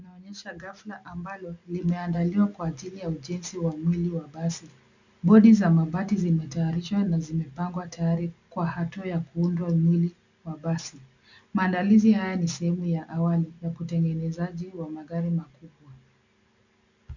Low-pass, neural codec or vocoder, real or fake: 7.2 kHz; none; real